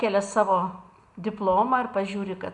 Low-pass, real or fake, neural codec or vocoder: 10.8 kHz; real; none